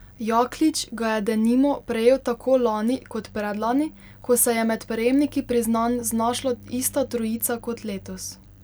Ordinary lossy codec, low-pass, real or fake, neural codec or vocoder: none; none; real; none